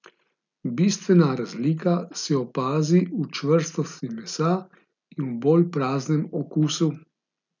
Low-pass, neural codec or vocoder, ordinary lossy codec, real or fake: 7.2 kHz; none; none; real